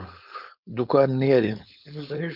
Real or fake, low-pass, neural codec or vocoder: fake; 5.4 kHz; codec, 16 kHz, 4.8 kbps, FACodec